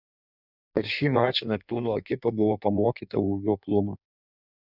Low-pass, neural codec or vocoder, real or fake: 5.4 kHz; codec, 16 kHz in and 24 kHz out, 1.1 kbps, FireRedTTS-2 codec; fake